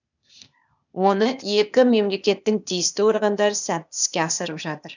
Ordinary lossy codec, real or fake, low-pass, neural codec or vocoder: none; fake; 7.2 kHz; codec, 16 kHz, 0.8 kbps, ZipCodec